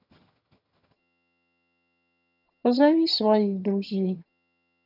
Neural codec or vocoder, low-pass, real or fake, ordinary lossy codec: vocoder, 22.05 kHz, 80 mel bands, HiFi-GAN; 5.4 kHz; fake; none